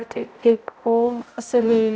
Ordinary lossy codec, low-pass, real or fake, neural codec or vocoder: none; none; fake; codec, 16 kHz, 0.5 kbps, X-Codec, HuBERT features, trained on balanced general audio